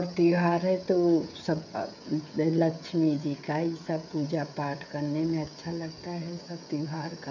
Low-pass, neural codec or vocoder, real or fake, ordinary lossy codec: 7.2 kHz; codec, 16 kHz, 16 kbps, FreqCodec, smaller model; fake; none